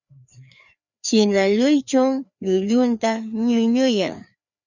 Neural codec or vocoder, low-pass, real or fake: codec, 16 kHz, 2 kbps, FreqCodec, larger model; 7.2 kHz; fake